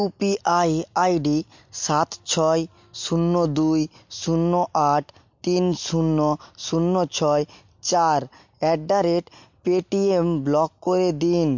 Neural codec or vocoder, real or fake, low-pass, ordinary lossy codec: none; real; 7.2 kHz; MP3, 48 kbps